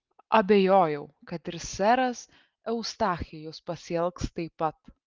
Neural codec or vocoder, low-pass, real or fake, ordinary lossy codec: none; 7.2 kHz; real; Opus, 24 kbps